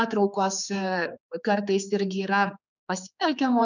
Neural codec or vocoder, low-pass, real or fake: codec, 16 kHz, 4 kbps, X-Codec, HuBERT features, trained on general audio; 7.2 kHz; fake